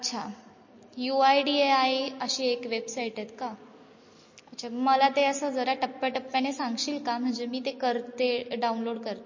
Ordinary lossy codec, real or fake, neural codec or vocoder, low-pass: MP3, 32 kbps; real; none; 7.2 kHz